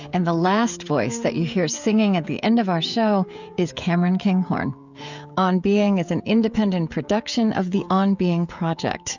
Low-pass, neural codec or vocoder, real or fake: 7.2 kHz; codec, 16 kHz, 16 kbps, FreqCodec, smaller model; fake